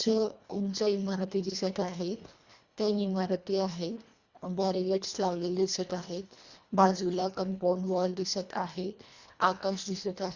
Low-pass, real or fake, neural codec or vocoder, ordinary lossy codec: 7.2 kHz; fake; codec, 24 kHz, 1.5 kbps, HILCodec; Opus, 64 kbps